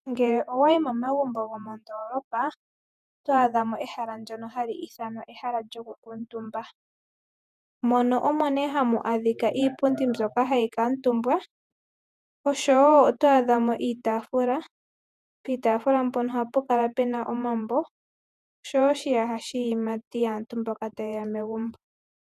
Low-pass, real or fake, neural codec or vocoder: 14.4 kHz; fake; vocoder, 48 kHz, 128 mel bands, Vocos